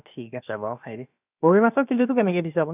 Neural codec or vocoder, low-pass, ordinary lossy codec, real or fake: codec, 16 kHz, about 1 kbps, DyCAST, with the encoder's durations; 3.6 kHz; none; fake